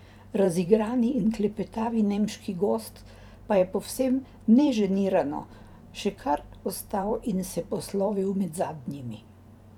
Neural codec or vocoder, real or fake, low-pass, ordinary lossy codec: vocoder, 44.1 kHz, 128 mel bands every 512 samples, BigVGAN v2; fake; 19.8 kHz; none